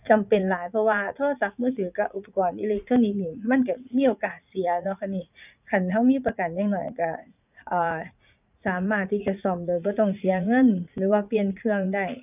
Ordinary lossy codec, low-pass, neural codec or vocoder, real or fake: none; 3.6 kHz; vocoder, 44.1 kHz, 80 mel bands, Vocos; fake